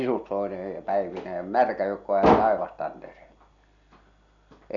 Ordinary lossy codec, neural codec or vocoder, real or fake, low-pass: MP3, 96 kbps; none; real; 7.2 kHz